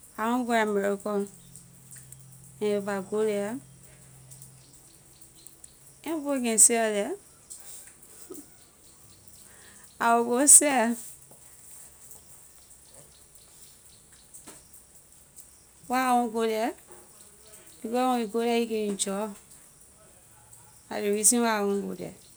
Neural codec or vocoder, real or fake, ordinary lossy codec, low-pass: none; real; none; none